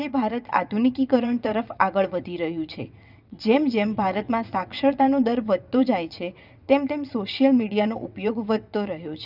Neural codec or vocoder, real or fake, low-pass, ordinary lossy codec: vocoder, 22.05 kHz, 80 mel bands, WaveNeXt; fake; 5.4 kHz; none